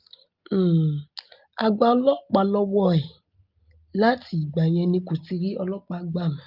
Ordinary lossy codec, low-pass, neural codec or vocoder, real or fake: Opus, 24 kbps; 5.4 kHz; none; real